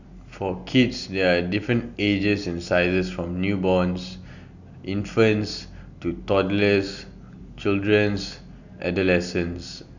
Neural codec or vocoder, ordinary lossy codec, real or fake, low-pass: none; none; real; 7.2 kHz